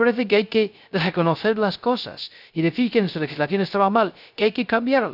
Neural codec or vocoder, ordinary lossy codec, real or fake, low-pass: codec, 16 kHz, 0.3 kbps, FocalCodec; none; fake; 5.4 kHz